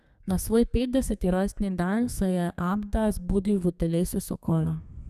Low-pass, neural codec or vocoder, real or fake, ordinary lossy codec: 14.4 kHz; codec, 32 kHz, 1.9 kbps, SNAC; fake; none